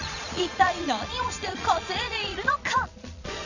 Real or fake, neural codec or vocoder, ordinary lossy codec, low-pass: fake; vocoder, 22.05 kHz, 80 mel bands, Vocos; none; 7.2 kHz